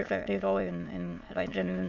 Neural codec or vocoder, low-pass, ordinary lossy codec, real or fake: autoencoder, 22.05 kHz, a latent of 192 numbers a frame, VITS, trained on many speakers; 7.2 kHz; none; fake